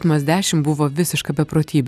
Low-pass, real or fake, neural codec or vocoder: 14.4 kHz; real; none